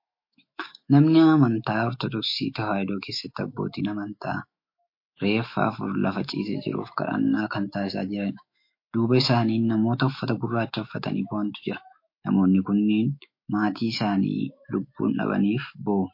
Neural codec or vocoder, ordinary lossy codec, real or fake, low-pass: autoencoder, 48 kHz, 128 numbers a frame, DAC-VAE, trained on Japanese speech; MP3, 32 kbps; fake; 5.4 kHz